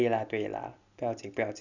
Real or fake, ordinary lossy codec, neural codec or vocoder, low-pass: real; none; none; 7.2 kHz